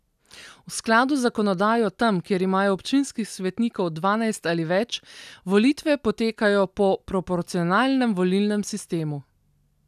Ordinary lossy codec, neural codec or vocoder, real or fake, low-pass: none; none; real; 14.4 kHz